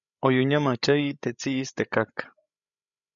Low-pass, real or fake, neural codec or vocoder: 7.2 kHz; fake; codec, 16 kHz, 16 kbps, FreqCodec, larger model